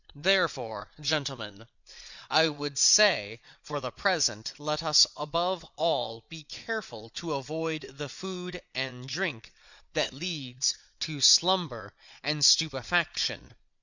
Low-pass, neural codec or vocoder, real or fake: 7.2 kHz; vocoder, 22.05 kHz, 80 mel bands, Vocos; fake